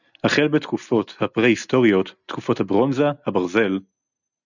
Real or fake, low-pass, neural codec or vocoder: real; 7.2 kHz; none